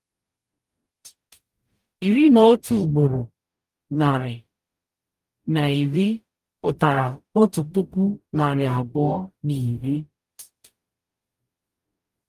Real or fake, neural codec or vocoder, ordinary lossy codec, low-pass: fake; codec, 44.1 kHz, 0.9 kbps, DAC; Opus, 32 kbps; 14.4 kHz